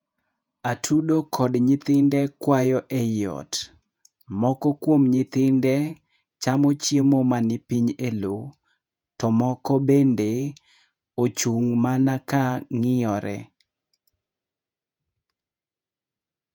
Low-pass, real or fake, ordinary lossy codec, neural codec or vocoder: 19.8 kHz; fake; none; vocoder, 48 kHz, 128 mel bands, Vocos